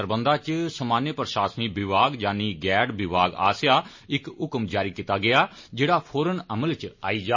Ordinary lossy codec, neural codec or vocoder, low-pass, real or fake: MP3, 32 kbps; none; 7.2 kHz; real